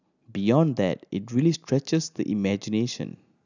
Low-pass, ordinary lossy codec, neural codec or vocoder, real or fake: 7.2 kHz; none; none; real